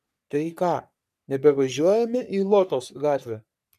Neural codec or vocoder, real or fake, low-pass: codec, 44.1 kHz, 3.4 kbps, Pupu-Codec; fake; 14.4 kHz